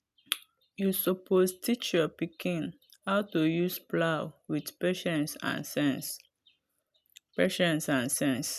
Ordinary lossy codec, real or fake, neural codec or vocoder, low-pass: none; real; none; 14.4 kHz